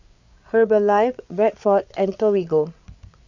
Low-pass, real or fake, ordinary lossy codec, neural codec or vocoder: 7.2 kHz; fake; none; codec, 16 kHz, 8 kbps, FreqCodec, larger model